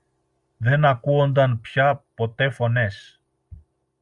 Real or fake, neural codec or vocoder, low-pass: real; none; 10.8 kHz